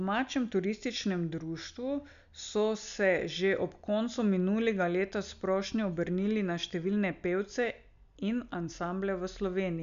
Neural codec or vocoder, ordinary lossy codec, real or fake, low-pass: none; none; real; 7.2 kHz